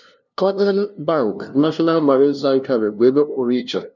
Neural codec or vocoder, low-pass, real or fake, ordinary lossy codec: codec, 16 kHz, 0.5 kbps, FunCodec, trained on LibriTTS, 25 frames a second; 7.2 kHz; fake; none